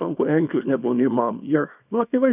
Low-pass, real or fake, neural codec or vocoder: 3.6 kHz; fake; codec, 24 kHz, 0.9 kbps, WavTokenizer, small release